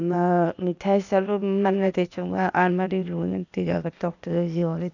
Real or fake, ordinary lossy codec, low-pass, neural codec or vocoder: fake; none; 7.2 kHz; codec, 16 kHz, 0.8 kbps, ZipCodec